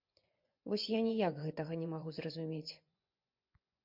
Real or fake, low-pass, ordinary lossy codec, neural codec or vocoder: fake; 5.4 kHz; MP3, 48 kbps; vocoder, 24 kHz, 100 mel bands, Vocos